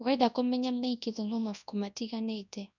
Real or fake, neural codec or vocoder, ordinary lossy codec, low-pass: fake; codec, 24 kHz, 0.9 kbps, WavTokenizer, large speech release; none; 7.2 kHz